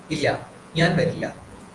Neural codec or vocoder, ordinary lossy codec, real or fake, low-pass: vocoder, 48 kHz, 128 mel bands, Vocos; Opus, 24 kbps; fake; 10.8 kHz